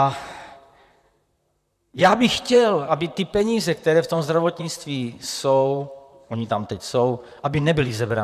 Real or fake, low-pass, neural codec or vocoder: fake; 14.4 kHz; vocoder, 44.1 kHz, 128 mel bands, Pupu-Vocoder